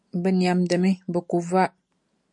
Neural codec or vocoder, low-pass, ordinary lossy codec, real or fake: none; 10.8 kHz; MP3, 64 kbps; real